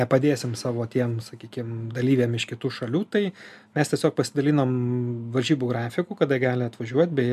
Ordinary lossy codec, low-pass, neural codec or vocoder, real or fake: MP3, 96 kbps; 14.4 kHz; none; real